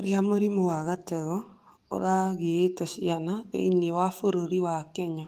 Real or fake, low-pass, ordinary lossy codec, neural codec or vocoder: fake; 14.4 kHz; Opus, 32 kbps; codec, 44.1 kHz, 7.8 kbps, DAC